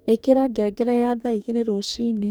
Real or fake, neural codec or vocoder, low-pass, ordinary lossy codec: fake; codec, 44.1 kHz, 2.6 kbps, DAC; none; none